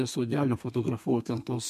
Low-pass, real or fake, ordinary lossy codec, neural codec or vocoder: 14.4 kHz; fake; MP3, 64 kbps; codec, 32 kHz, 1.9 kbps, SNAC